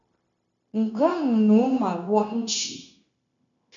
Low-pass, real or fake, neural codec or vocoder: 7.2 kHz; fake; codec, 16 kHz, 0.9 kbps, LongCat-Audio-Codec